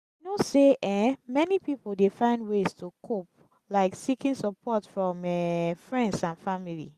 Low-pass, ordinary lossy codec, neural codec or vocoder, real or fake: 14.4 kHz; Opus, 64 kbps; none; real